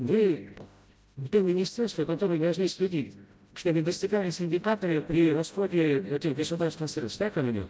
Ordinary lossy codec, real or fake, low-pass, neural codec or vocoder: none; fake; none; codec, 16 kHz, 0.5 kbps, FreqCodec, smaller model